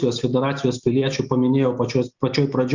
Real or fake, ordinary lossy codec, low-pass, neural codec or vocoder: real; Opus, 64 kbps; 7.2 kHz; none